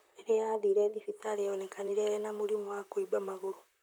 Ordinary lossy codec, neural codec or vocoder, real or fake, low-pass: none; vocoder, 44.1 kHz, 128 mel bands, Pupu-Vocoder; fake; none